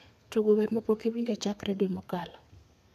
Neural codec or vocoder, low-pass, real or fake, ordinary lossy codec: codec, 32 kHz, 1.9 kbps, SNAC; 14.4 kHz; fake; none